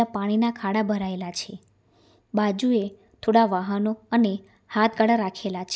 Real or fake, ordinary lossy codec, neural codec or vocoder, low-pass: real; none; none; none